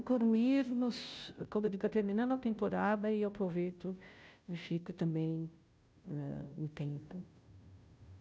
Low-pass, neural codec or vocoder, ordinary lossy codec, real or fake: none; codec, 16 kHz, 0.5 kbps, FunCodec, trained on Chinese and English, 25 frames a second; none; fake